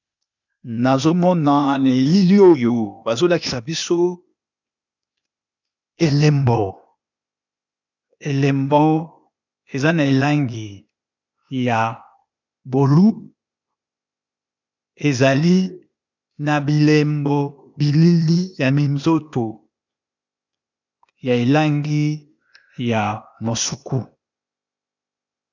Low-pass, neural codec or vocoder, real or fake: 7.2 kHz; codec, 16 kHz, 0.8 kbps, ZipCodec; fake